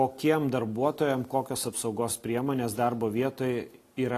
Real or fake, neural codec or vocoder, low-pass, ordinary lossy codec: real; none; 14.4 kHz; AAC, 48 kbps